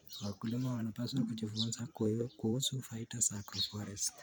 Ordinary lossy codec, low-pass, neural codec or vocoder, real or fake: none; none; vocoder, 44.1 kHz, 128 mel bands every 512 samples, BigVGAN v2; fake